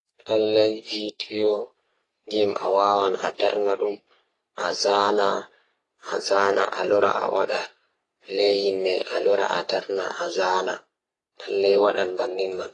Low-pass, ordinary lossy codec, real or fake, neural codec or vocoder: 10.8 kHz; AAC, 32 kbps; fake; codec, 44.1 kHz, 3.4 kbps, Pupu-Codec